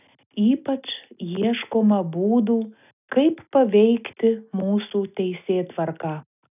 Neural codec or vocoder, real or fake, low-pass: none; real; 3.6 kHz